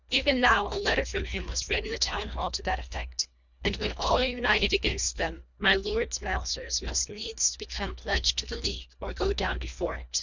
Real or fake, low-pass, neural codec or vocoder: fake; 7.2 kHz; codec, 24 kHz, 1.5 kbps, HILCodec